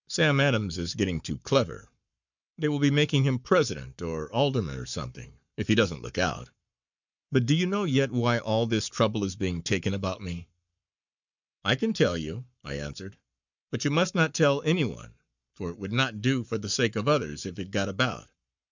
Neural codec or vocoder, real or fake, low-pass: codec, 44.1 kHz, 7.8 kbps, Pupu-Codec; fake; 7.2 kHz